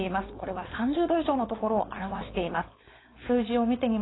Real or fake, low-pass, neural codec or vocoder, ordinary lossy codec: fake; 7.2 kHz; codec, 16 kHz, 4.8 kbps, FACodec; AAC, 16 kbps